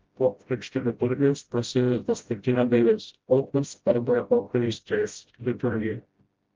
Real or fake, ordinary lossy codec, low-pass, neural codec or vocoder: fake; Opus, 32 kbps; 7.2 kHz; codec, 16 kHz, 0.5 kbps, FreqCodec, smaller model